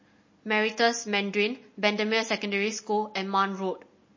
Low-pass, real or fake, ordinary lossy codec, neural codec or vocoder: 7.2 kHz; real; MP3, 32 kbps; none